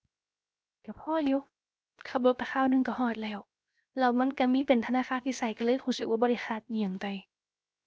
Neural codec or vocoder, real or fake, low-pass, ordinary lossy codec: codec, 16 kHz, 0.7 kbps, FocalCodec; fake; none; none